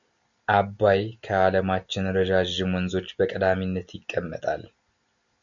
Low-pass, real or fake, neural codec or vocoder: 7.2 kHz; real; none